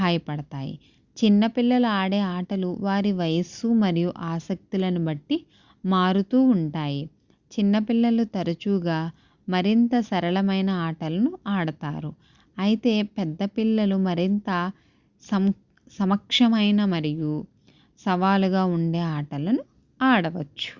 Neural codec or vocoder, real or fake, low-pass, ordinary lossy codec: none; real; 7.2 kHz; Opus, 64 kbps